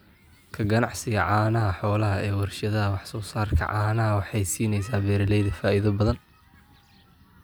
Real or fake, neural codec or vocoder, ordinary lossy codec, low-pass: real; none; none; none